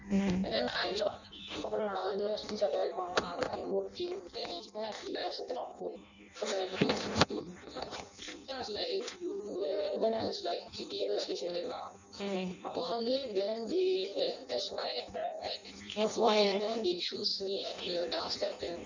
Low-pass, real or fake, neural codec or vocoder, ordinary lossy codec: 7.2 kHz; fake; codec, 16 kHz in and 24 kHz out, 0.6 kbps, FireRedTTS-2 codec; none